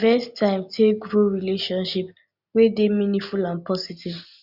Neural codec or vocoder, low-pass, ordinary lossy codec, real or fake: none; 5.4 kHz; Opus, 64 kbps; real